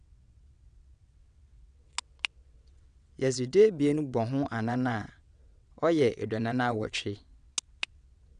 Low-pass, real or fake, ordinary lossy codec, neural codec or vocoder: 9.9 kHz; fake; none; vocoder, 22.05 kHz, 80 mel bands, WaveNeXt